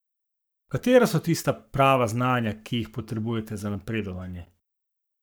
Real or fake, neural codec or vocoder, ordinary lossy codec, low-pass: fake; codec, 44.1 kHz, 7.8 kbps, Pupu-Codec; none; none